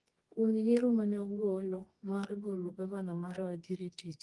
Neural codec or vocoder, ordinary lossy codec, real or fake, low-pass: codec, 24 kHz, 0.9 kbps, WavTokenizer, medium music audio release; Opus, 24 kbps; fake; 10.8 kHz